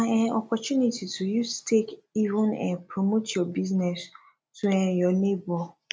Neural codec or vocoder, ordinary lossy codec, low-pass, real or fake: none; none; none; real